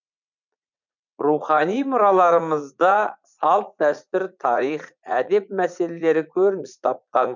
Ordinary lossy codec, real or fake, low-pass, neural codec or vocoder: none; fake; 7.2 kHz; vocoder, 44.1 kHz, 80 mel bands, Vocos